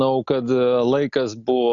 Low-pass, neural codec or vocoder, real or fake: 7.2 kHz; none; real